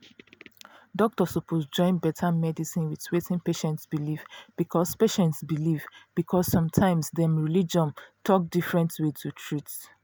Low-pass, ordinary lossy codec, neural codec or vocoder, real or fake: none; none; none; real